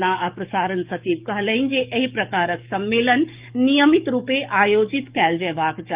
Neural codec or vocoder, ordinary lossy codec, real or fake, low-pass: codec, 44.1 kHz, 7.8 kbps, DAC; Opus, 24 kbps; fake; 3.6 kHz